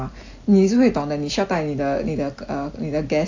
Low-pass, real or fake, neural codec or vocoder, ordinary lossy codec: 7.2 kHz; real; none; AAC, 48 kbps